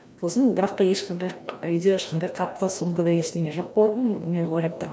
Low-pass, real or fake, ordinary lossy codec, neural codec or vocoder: none; fake; none; codec, 16 kHz, 1 kbps, FreqCodec, larger model